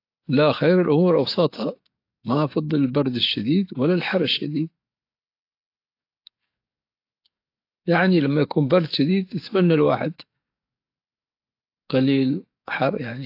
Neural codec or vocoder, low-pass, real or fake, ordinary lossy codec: codec, 44.1 kHz, 7.8 kbps, DAC; 5.4 kHz; fake; AAC, 32 kbps